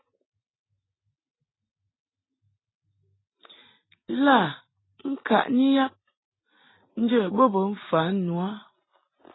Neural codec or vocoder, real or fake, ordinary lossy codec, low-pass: none; real; AAC, 16 kbps; 7.2 kHz